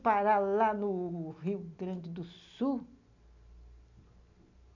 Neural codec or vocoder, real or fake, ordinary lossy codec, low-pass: none; real; none; 7.2 kHz